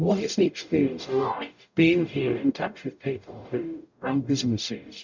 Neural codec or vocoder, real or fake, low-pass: codec, 44.1 kHz, 0.9 kbps, DAC; fake; 7.2 kHz